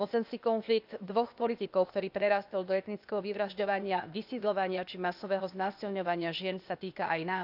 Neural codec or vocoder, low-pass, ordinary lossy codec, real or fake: codec, 16 kHz, 0.8 kbps, ZipCodec; 5.4 kHz; none; fake